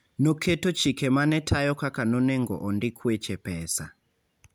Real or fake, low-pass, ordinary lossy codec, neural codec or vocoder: real; none; none; none